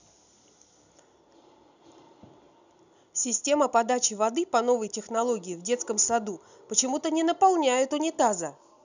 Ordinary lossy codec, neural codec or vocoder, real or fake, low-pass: none; none; real; 7.2 kHz